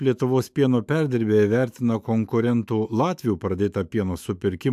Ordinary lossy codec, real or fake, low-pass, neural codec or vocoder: AAC, 96 kbps; fake; 14.4 kHz; autoencoder, 48 kHz, 128 numbers a frame, DAC-VAE, trained on Japanese speech